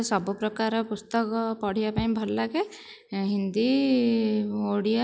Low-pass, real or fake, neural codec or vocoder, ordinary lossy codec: none; real; none; none